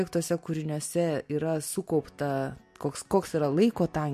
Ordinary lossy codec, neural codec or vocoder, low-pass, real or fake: MP3, 64 kbps; none; 14.4 kHz; real